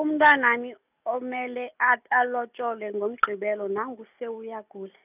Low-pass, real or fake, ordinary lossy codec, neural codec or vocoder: 3.6 kHz; real; none; none